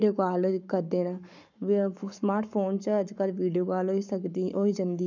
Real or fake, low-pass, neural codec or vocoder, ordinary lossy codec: fake; 7.2 kHz; codec, 44.1 kHz, 7.8 kbps, Pupu-Codec; none